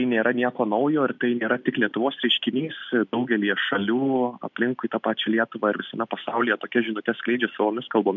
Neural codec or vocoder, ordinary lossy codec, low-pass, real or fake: none; MP3, 64 kbps; 7.2 kHz; real